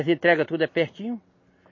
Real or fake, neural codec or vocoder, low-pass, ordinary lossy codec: real; none; 7.2 kHz; MP3, 32 kbps